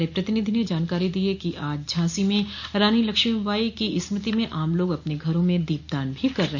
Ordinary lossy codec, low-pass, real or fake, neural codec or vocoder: none; none; real; none